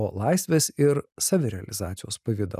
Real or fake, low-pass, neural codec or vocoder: real; 14.4 kHz; none